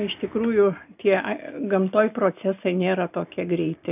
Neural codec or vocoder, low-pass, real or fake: none; 3.6 kHz; real